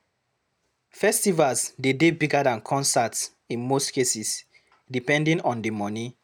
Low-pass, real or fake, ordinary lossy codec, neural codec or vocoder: none; real; none; none